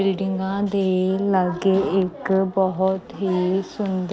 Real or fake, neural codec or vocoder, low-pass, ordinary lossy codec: real; none; none; none